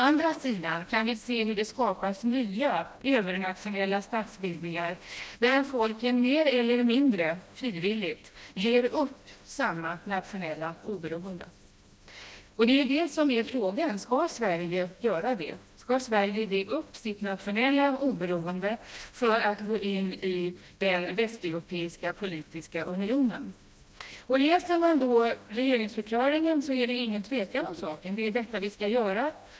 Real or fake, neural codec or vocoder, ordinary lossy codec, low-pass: fake; codec, 16 kHz, 1 kbps, FreqCodec, smaller model; none; none